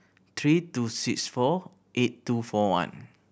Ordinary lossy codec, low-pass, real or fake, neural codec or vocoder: none; none; real; none